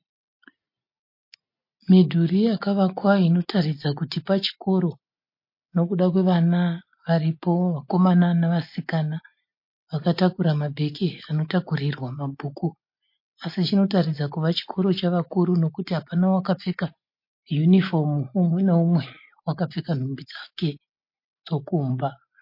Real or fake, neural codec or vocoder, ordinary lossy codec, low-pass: real; none; MP3, 32 kbps; 5.4 kHz